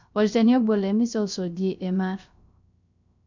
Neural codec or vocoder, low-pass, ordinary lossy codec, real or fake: codec, 16 kHz, 0.3 kbps, FocalCodec; 7.2 kHz; none; fake